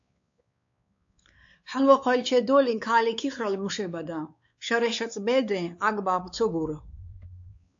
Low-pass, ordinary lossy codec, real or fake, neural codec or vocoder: 7.2 kHz; AAC, 64 kbps; fake; codec, 16 kHz, 4 kbps, X-Codec, WavLM features, trained on Multilingual LibriSpeech